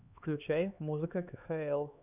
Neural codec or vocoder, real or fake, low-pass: codec, 16 kHz, 2 kbps, X-Codec, HuBERT features, trained on LibriSpeech; fake; 3.6 kHz